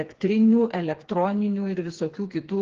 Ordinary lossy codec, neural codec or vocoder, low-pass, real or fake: Opus, 16 kbps; codec, 16 kHz, 4 kbps, FreqCodec, smaller model; 7.2 kHz; fake